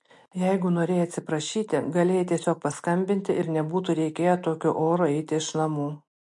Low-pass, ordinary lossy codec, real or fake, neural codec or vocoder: 10.8 kHz; MP3, 48 kbps; real; none